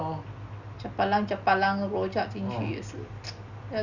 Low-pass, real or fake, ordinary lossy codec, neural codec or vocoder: 7.2 kHz; real; none; none